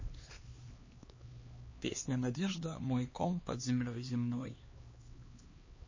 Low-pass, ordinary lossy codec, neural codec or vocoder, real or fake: 7.2 kHz; MP3, 32 kbps; codec, 16 kHz, 2 kbps, X-Codec, HuBERT features, trained on LibriSpeech; fake